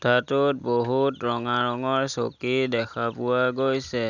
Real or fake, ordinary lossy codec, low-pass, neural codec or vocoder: real; none; 7.2 kHz; none